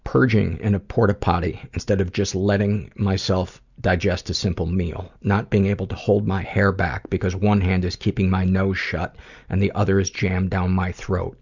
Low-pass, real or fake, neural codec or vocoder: 7.2 kHz; real; none